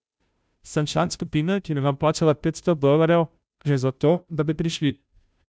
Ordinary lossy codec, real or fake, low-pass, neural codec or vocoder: none; fake; none; codec, 16 kHz, 0.5 kbps, FunCodec, trained on Chinese and English, 25 frames a second